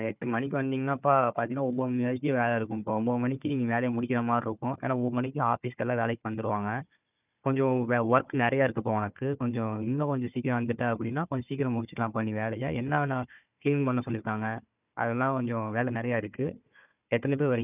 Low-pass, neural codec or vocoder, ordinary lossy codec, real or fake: 3.6 kHz; codec, 16 kHz, 4 kbps, FunCodec, trained on Chinese and English, 50 frames a second; none; fake